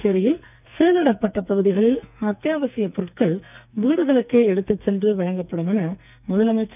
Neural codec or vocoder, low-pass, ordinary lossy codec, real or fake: codec, 44.1 kHz, 2.6 kbps, SNAC; 3.6 kHz; none; fake